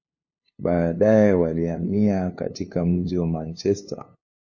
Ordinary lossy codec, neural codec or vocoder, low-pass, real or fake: MP3, 32 kbps; codec, 16 kHz, 2 kbps, FunCodec, trained on LibriTTS, 25 frames a second; 7.2 kHz; fake